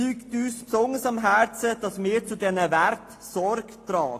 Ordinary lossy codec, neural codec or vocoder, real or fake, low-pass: AAC, 48 kbps; none; real; 14.4 kHz